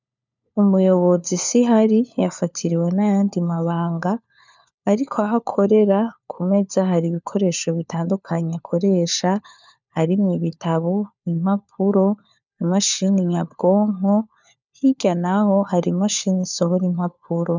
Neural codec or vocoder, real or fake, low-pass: codec, 16 kHz, 4 kbps, FunCodec, trained on LibriTTS, 50 frames a second; fake; 7.2 kHz